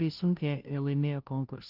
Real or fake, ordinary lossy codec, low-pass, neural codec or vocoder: fake; Opus, 32 kbps; 5.4 kHz; codec, 16 kHz, 0.5 kbps, FunCodec, trained on Chinese and English, 25 frames a second